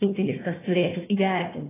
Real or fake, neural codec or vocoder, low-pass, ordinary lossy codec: fake; codec, 16 kHz, 1 kbps, FunCodec, trained on Chinese and English, 50 frames a second; 3.6 kHz; AAC, 16 kbps